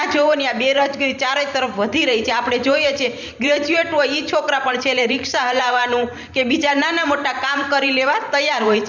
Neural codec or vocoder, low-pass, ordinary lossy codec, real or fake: vocoder, 44.1 kHz, 128 mel bands every 512 samples, BigVGAN v2; 7.2 kHz; none; fake